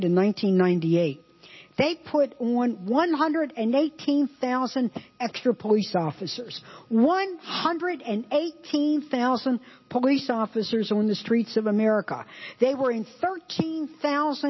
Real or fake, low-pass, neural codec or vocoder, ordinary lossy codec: real; 7.2 kHz; none; MP3, 24 kbps